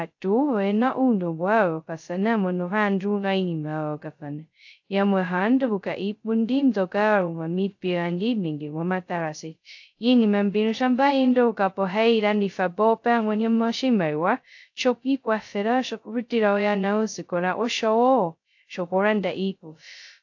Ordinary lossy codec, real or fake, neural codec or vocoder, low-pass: AAC, 48 kbps; fake; codec, 16 kHz, 0.2 kbps, FocalCodec; 7.2 kHz